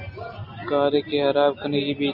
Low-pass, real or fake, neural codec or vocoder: 5.4 kHz; real; none